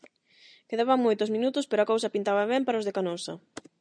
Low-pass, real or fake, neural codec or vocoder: 9.9 kHz; real; none